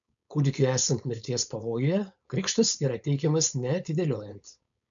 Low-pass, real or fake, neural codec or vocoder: 7.2 kHz; fake; codec, 16 kHz, 4.8 kbps, FACodec